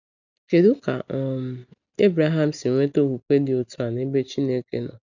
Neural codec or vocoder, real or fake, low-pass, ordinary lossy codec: none; real; 7.2 kHz; none